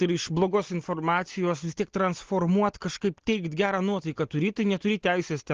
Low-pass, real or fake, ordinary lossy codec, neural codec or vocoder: 7.2 kHz; real; Opus, 16 kbps; none